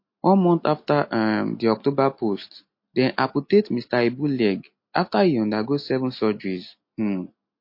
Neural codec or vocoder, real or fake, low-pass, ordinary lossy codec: none; real; 5.4 kHz; MP3, 32 kbps